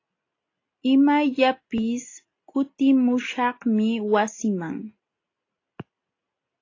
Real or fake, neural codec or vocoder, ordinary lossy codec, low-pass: real; none; AAC, 32 kbps; 7.2 kHz